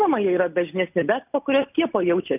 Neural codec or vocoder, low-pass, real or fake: none; 3.6 kHz; real